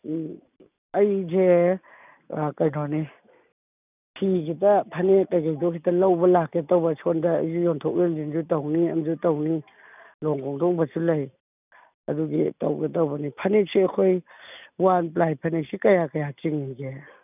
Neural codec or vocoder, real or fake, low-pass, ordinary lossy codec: none; real; 3.6 kHz; none